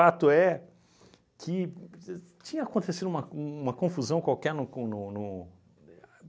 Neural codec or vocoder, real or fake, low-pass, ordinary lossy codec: none; real; none; none